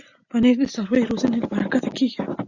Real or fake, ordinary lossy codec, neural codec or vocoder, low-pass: real; Opus, 64 kbps; none; 7.2 kHz